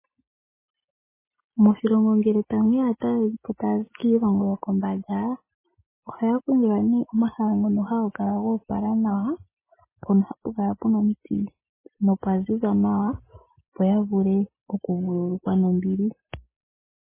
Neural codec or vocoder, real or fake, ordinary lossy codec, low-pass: none; real; MP3, 16 kbps; 3.6 kHz